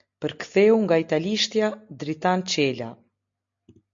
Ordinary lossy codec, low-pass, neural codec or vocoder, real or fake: MP3, 48 kbps; 7.2 kHz; none; real